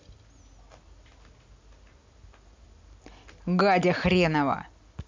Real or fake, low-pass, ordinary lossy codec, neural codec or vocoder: real; 7.2 kHz; MP3, 64 kbps; none